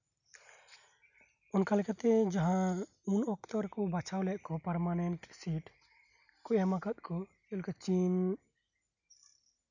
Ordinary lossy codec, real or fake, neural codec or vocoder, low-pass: none; real; none; 7.2 kHz